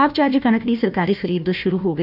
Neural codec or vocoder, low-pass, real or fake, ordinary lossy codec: codec, 16 kHz, 1 kbps, FunCodec, trained on Chinese and English, 50 frames a second; 5.4 kHz; fake; none